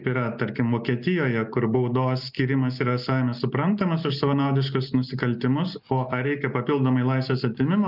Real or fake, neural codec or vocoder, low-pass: real; none; 5.4 kHz